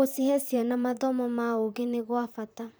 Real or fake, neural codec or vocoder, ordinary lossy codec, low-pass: fake; vocoder, 44.1 kHz, 128 mel bands, Pupu-Vocoder; none; none